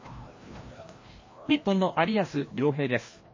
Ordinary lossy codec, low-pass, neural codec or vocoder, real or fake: MP3, 32 kbps; 7.2 kHz; codec, 16 kHz, 1 kbps, FreqCodec, larger model; fake